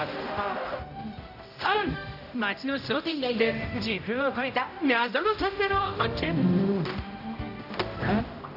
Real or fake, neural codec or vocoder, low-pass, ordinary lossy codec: fake; codec, 16 kHz, 0.5 kbps, X-Codec, HuBERT features, trained on general audio; 5.4 kHz; AAC, 48 kbps